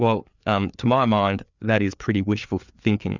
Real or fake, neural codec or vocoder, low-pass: fake; codec, 16 kHz, 4 kbps, FreqCodec, larger model; 7.2 kHz